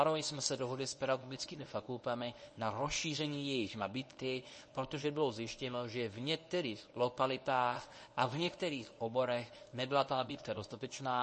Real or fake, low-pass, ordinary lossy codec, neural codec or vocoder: fake; 10.8 kHz; MP3, 32 kbps; codec, 24 kHz, 0.9 kbps, WavTokenizer, medium speech release version 1